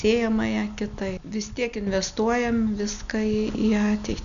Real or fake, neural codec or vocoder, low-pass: real; none; 7.2 kHz